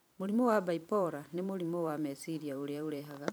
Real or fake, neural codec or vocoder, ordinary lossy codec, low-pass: fake; vocoder, 44.1 kHz, 128 mel bands every 512 samples, BigVGAN v2; none; none